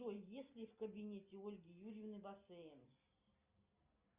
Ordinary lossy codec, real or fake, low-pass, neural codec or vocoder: Opus, 64 kbps; real; 3.6 kHz; none